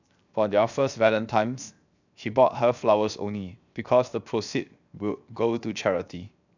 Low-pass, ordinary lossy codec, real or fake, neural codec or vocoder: 7.2 kHz; none; fake; codec, 16 kHz, 0.7 kbps, FocalCodec